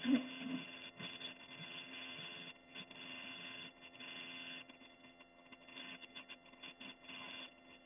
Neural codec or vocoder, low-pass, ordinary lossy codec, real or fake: vocoder, 22.05 kHz, 80 mel bands, HiFi-GAN; 3.6 kHz; none; fake